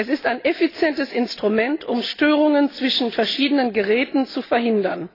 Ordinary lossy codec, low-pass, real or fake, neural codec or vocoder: AAC, 24 kbps; 5.4 kHz; real; none